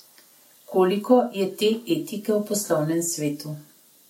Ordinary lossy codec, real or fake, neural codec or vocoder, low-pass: MP3, 64 kbps; real; none; 19.8 kHz